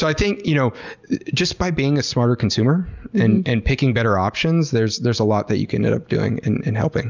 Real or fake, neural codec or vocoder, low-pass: real; none; 7.2 kHz